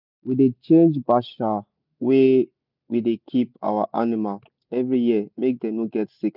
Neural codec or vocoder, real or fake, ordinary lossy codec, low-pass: none; real; none; 5.4 kHz